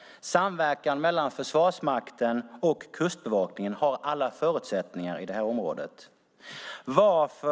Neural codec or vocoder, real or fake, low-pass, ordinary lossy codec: none; real; none; none